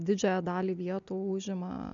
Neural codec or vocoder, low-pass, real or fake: none; 7.2 kHz; real